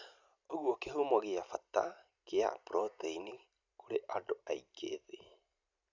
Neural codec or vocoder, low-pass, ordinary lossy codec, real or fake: none; 7.2 kHz; none; real